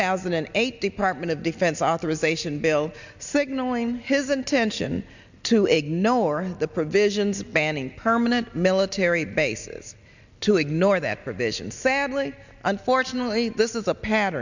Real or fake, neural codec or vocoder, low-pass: real; none; 7.2 kHz